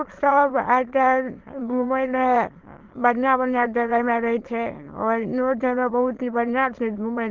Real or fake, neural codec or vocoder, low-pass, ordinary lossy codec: fake; autoencoder, 22.05 kHz, a latent of 192 numbers a frame, VITS, trained on many speakers; 7.2 kHz; Opus, 16 kbps